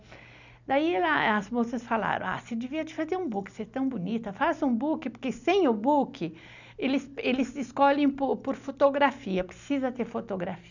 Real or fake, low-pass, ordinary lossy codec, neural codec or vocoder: real; 7.2 kHz; none; none